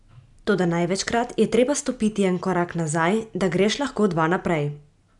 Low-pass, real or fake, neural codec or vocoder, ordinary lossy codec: 10.8 kHz; real; none; MP3, 96 kbps